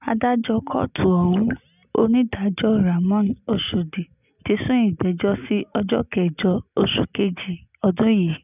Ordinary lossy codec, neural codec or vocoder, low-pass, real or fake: none; none; 3.6 kHz; real